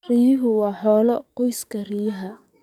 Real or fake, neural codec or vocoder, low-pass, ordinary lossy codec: fake; codec, 44.1 kHz, 7.8 kbps, DAC; 19.8 kHz; none